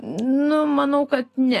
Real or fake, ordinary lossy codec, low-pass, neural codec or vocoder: real; AAC, 48 kbps; 14.4 kHz; none